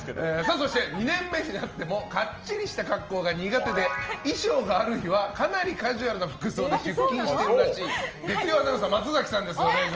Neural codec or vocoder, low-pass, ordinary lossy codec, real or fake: none; 7.2 kHz; Opus, 24 kbps; real